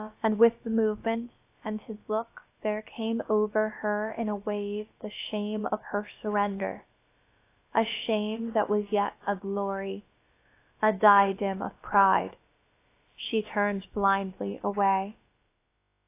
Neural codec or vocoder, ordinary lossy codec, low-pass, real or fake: codec, 16 kHz, about 1 kbps, DyCAST, with the encoder's durations; AAC, 24 kbps; 3.6 kHz; fake